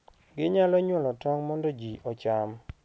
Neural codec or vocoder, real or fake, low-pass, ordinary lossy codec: none; real; none; none